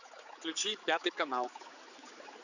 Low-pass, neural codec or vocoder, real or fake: 7.2 kHz; codec, 16 kHz, 4 kbps, X-Codec, HuBERT features, trained on general audio; fake